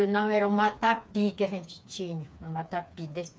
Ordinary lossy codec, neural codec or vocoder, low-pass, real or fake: none; codec, 16 kHz, 4 kbps, FreqCodec, smaller model; none; fake